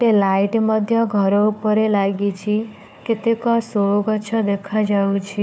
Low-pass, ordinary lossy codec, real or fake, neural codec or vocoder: none; none; fake; codec, 16 kHz, 4 kbps, FunCodec, trained on Chinese and English, 50 frames a second